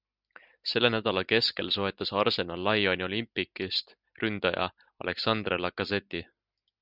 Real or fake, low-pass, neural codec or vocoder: real; 5.4 kHz; none